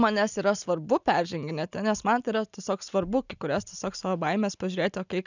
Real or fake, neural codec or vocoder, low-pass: real; none; 7.2 kHz